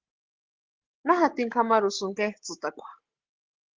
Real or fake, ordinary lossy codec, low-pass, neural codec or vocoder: real; Opus, 16 kbps; 7.2 kHz; none